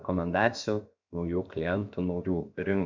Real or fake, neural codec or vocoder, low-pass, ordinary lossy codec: fake; codec, 16 kHz, 0.7 kbps, FocalCodec; 7.2 kHz; MP3, 64 kbps